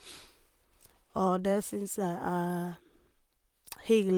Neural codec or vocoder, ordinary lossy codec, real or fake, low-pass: vocoder, 44.1 kHz, 128 mel bands, Pupu-Vocoder; Opus, 32 kbps; fake; 19.8 kHz